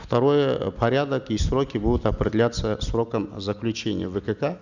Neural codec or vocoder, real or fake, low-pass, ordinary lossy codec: none; real; 7.2 kHz; none